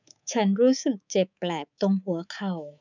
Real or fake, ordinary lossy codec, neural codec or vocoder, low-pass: fake; none; codec, 24 kHz, 3.1 kbps, DualCodec; 7.2 kHz